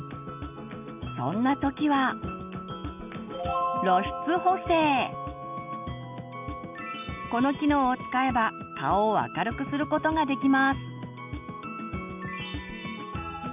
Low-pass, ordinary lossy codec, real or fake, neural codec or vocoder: 3.6 kHz; none; real; none